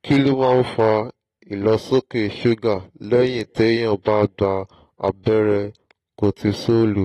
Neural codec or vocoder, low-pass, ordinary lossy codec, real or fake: none; 19.8 kHz; AAC, 32 kbps; real